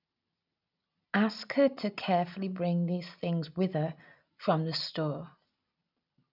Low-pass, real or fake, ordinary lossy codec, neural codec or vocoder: 5.4 kHz; real; none; none